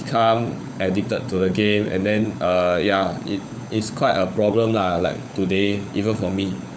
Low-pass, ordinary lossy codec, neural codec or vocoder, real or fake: none; none; codec, 16 kHz, 16 kbps, FunCodec, trained on LibriTTS, 50 frames a second; fake